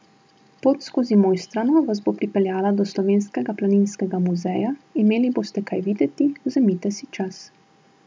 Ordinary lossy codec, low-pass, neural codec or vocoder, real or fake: none; none; none; real